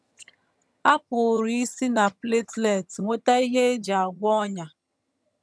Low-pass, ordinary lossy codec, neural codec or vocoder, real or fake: none; none; vocoder, 22.05 kHz, 80 mel bands, HiFi-GAN; fake